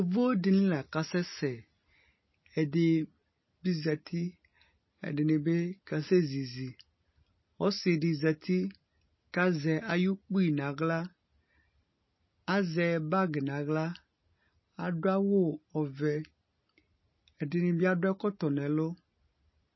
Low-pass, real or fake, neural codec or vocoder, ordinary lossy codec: 7.2 kHz; real; none; MP3, 24 kbps